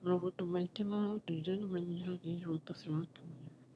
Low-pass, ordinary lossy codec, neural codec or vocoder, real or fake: none; none; autoencoder, 22.05 kHz, a latent of 192 numbers a frame, VITS, trained on one speaker; fake